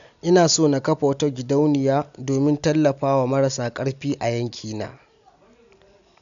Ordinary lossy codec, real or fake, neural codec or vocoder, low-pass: none; real; none; 7.2 kHz